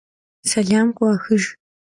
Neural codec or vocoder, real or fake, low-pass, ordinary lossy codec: none; real; 10.8 kHz; AAC, 64 kbps